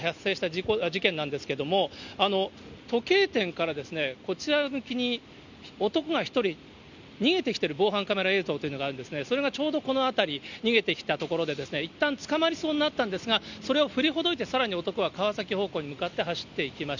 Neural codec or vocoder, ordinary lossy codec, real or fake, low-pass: none; none; real; 7.2 kHz